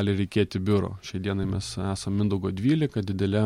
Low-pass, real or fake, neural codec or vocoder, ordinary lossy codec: 19.8 kHz; real; none; MP3, 64 kbps